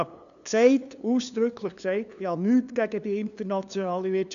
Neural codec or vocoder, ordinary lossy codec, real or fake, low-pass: codec, 16 kHz, 2 kbps, FunCodec, trained on LibriTTS, 25 frames a second; none; fake; 7.2 kHz